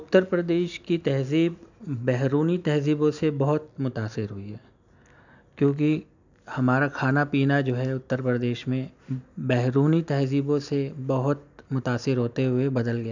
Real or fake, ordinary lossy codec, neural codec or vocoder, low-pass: real; none; none; 7.2 kHz